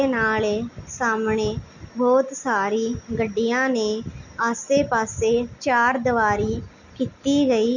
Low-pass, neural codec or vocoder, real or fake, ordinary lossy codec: 7.2 kHz; none; real; none